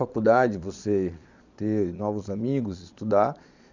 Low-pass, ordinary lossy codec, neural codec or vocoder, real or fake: 7.2 kHz; none; vocoder, 22.05 kHz, 80 mel bands, Vocos; fake